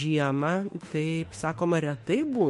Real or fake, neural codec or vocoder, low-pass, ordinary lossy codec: fake; autoencoder, 48 kHz, 32 numbers a frame, DAC-VAE, trained on Japanese speech; 14.4 kHz; MP3, 48 kbps